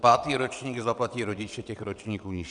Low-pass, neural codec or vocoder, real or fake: 9.9 kHz; vocoder, 22.05 kHz, 80 mel bands, WaveNeXt; fake